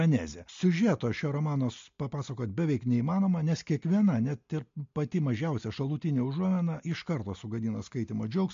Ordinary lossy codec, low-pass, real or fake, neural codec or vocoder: MP3, 48 kbps; 7.2 kHz; real; none